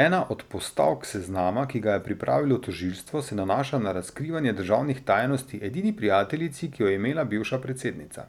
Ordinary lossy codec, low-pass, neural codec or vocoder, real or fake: none; 19.8 kHz; none; real